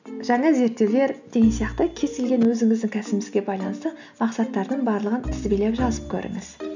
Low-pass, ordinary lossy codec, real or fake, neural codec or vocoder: 7.2 kHz; none; real; none